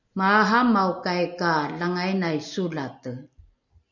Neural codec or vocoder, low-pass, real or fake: none; 7.2 kHz; real